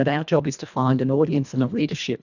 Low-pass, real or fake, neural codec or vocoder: 7.2 kHz; fake; codec, 24 kHz, 1.5 kbps, HILCodec